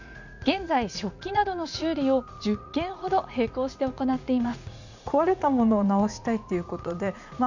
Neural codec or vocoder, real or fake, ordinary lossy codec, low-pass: vocoder, 44.1 kHz, 80 mel bands, Vocos; fake; none; 7.2 kHz